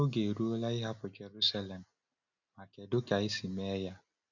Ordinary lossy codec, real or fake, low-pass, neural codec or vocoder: none; real; 7.2 kHz; none